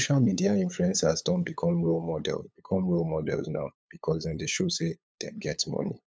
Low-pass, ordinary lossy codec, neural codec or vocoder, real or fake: none; none; codec, 16 kHz, 8 kbps, FunCodec, trained on LibriTTS, 25 frames a second; fake